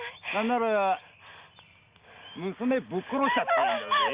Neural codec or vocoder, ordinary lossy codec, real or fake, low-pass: none; Opus, 64 kbps; real; 3.6 kHz